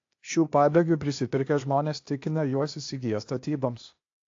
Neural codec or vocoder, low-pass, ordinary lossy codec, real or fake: codec, 16 kHz, 0.8 kbps, ZipCodec; 7.2 kHz; AAC, 48 kbps; fake